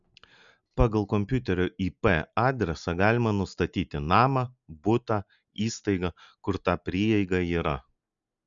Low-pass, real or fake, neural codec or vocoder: 7.2 kHz; real; none